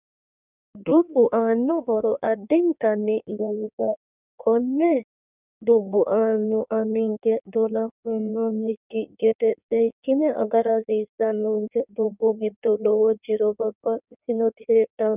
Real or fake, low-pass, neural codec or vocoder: fake; 3.6 kHz; codec, 16 kHz in and 24 kHz out, 1.1 kbps, FireRedTTS-2 codec